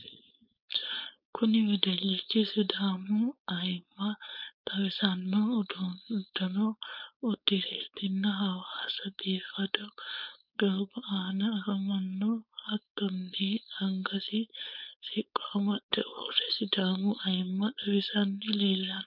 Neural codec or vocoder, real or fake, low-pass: codec, 16 kHz, 4.8 kbps, FACodec; fake; 5.4 kHz